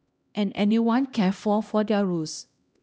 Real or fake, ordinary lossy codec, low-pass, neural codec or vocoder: fake; none; none; codec, 16 kHz, 1 kbps, X-Codec, HuBERT features, trained on LibriSpeech